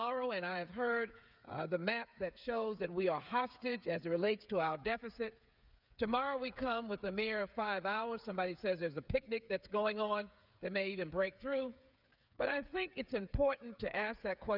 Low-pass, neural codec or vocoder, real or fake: 5.4 kHz; codec, 16 kHz, 8 kbps, FreqCodec, smaller model; fake